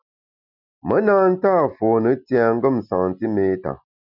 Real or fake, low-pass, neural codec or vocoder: real; 5.4 kHz; none